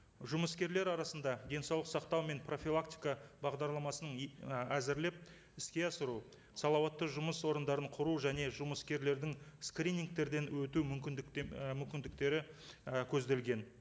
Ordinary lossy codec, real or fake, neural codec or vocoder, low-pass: none; real; none; none